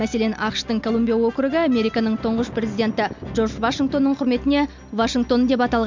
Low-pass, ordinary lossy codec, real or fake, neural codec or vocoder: 7.2 kHz; MP3, 64 kbps; real; none